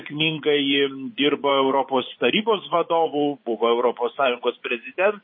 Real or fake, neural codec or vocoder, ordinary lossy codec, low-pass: fake; vocoder, 24 kHz, 100 mel bands, Vocos; MP3, 24 kbps; 7.2 kHz